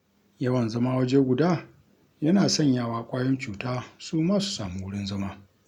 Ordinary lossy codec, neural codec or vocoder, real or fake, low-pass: none; none; real; 19.8 kHz